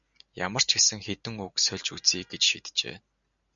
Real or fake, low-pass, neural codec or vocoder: real; 7.2 kHz; none